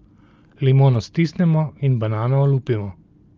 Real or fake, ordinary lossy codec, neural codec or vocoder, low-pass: real; Opus, 32 kbps; none; 7.2 kHz